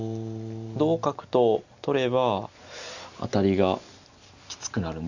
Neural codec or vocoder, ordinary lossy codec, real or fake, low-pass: none; Opus, 64 kbps; real; 7.2 kHz